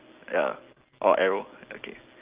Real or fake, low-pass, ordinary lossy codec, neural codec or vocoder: real; 3.6 kHz; Opus, 32 kbps; none